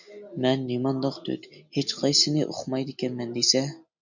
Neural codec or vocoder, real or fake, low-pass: none; real; 7.2 kHz